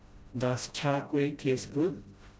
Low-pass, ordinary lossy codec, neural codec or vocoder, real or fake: none; none; codec, 16 kHz, 0.5 kbps, FreqCodec, smaller model; fake